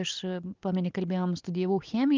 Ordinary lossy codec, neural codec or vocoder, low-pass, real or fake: Opus, 32 kbps; none; 7.2 kHz; real